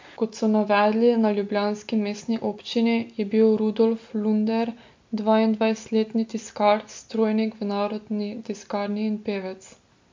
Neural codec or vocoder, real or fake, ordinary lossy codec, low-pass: none; real; MP3, 48 kbps; 7.2 kHz